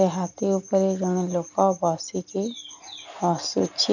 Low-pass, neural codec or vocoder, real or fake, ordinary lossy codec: 7.2 kHz; none; real; none